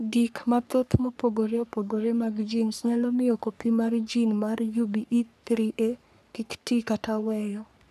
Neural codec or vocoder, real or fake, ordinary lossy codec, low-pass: codec, 44.1 kHz, 3.4 kbps, Pupu-Codec; fake; none; 14.4 kHz